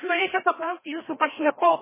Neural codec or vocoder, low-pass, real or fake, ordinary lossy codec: codec, 16 kHz, 1 kbps, FreqCodec, larger model; 3.6 kHz; fake; MP3, 16 kbps